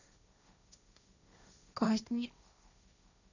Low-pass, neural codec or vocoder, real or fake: 7.2 kHz; codec, 16 kHz, 1.1 kbps, Voila-Tokenizer; fake